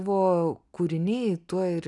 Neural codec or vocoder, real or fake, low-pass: none; real; 10.8 kHz